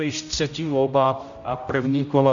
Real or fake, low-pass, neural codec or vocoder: fake; 7.2 kHz; codec, 16 kHz, 0.5 kbps, X-Codec, HuBERT features, trained on general audio